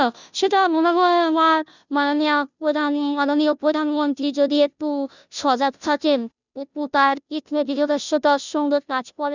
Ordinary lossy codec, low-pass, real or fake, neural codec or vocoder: none; 7.2 kHz; fake; codec, 16 kHz, 0.5 kbps, FunCodec, trained on Chinese and English, 25 frames a second